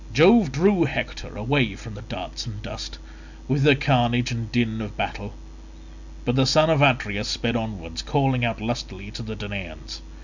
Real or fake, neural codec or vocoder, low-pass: real; none; 7.2 kHz